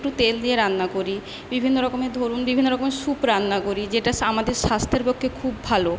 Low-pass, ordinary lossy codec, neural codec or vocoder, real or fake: none; none; none; real